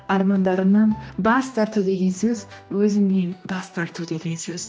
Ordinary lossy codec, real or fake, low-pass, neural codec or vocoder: none; fake; none; codec, 16 kHz, 1 kbps, X-Codec, HuBERT features, trained on general audio